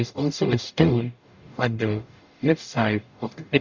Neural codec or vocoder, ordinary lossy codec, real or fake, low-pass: codec, 44.1 kHz, 0.9 kbps, DAC; Opus, 64 kbps; fake; 7.2 kHz